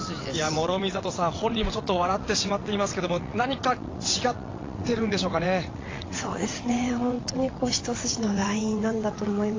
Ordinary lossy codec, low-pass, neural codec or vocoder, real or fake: AAC, 32 kbps; 7.2 kHz; vocoder, 22.05 kHz, 80 mel bands, WaveNeXt; fake